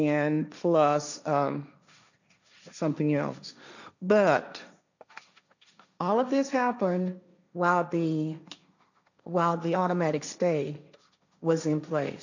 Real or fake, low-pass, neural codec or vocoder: fake; 7.2 kHz; codec, 16 kHz, 1.1 kbps, Voila-Tokenizer